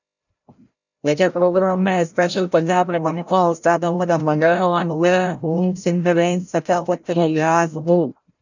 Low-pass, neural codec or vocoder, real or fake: 7.2 kHz; codec, 16 kHz, 0.5 kbps, FreqCodec, larger model; fake